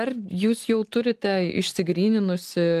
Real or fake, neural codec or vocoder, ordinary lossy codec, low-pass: real; none; Opus, 24 kbps; 14.4 kHz